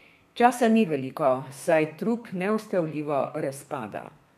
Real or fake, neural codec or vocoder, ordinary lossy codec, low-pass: fake; codec, 32 kHz, 1.9 kbps, SNAC; none; 14.4 kHz